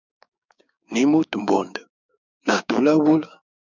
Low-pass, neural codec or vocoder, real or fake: 7.2 kHz; codec, 16 kHz, 6 kbps, DAC; fake